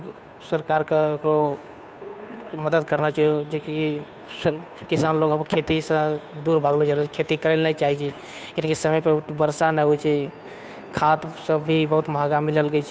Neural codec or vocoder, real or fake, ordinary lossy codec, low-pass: codec, 16 kHz, 2 kbps, FunCodec, trained on Chinese and English, 25 frames a second; fake; none; none